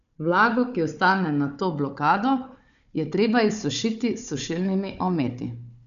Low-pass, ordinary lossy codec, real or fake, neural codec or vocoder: 7.2 kHz; none; fake; codec, 16 kHz, 4 kbps, FunCodec, trained on Chinese and English, 50 frames a second